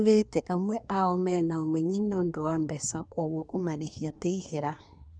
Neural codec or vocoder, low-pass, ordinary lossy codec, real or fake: codec, 24 kHz, 1 kbps, SNAC; 9.9 kHz; none; fake